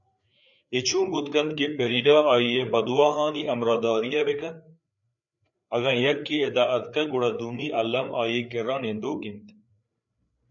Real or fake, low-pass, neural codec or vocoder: fake; 7.2 kHz; codec, 16 kHz, 4 kbps, FreqCodec, larger model